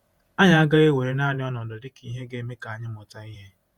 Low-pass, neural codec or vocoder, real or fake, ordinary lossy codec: 19.8 kHz; vocoder, 48 kHz, 128 mel bands, Vocos; fake; Opus, 64 kbps